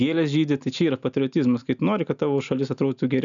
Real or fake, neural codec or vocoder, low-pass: real; none; 7.2 kHz